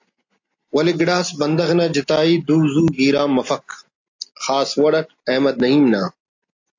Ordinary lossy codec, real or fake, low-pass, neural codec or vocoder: AAC, 48 kbps; real; 7.2 kHz; none